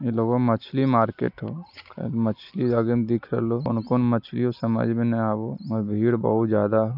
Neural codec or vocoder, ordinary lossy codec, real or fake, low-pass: none; none; real; 5.4 kHz